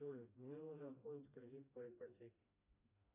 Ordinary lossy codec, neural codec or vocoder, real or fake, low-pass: AAC, 24 kbps; codec, 16 kHz, 1 kbps, FreqCodec, smaller model; fake; 3.6 kHz